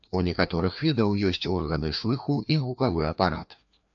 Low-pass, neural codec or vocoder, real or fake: 7.2 kHz; codec, 16 kHz, 2 kbps, FreqCodec, larger model; fake